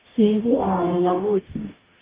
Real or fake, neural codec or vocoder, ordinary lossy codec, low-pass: fake; codec, 44.1 kHz, 0.9 kbps, DAC; Opus, 24 kbps; 3.6 kHz